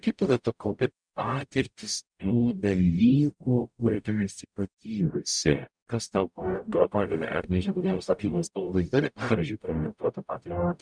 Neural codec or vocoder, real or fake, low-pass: codec, 44.1 kHz, 0.9 kbps, DAC; fake; 9.9 kHz